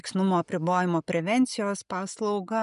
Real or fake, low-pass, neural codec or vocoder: real; 10.8 kHz; none